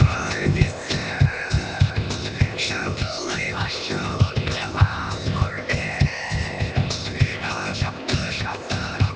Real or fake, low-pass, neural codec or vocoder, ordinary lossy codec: fake; none; codec, 16 kHz, 0.8 kbps, ZipCodec; none